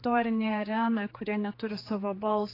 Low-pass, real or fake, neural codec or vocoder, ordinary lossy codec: 5.4 kHz; fake; codec, 16 kHz, 4 kbps, X-Codec, HuBERT features, trained on general audio; AAC, 24 kbps